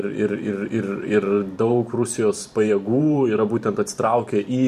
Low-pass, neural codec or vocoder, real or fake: 14.4 kHz; vocoder, 44.1 kHz, 128 mel bands every 512 samples, BigVGAN v2; fake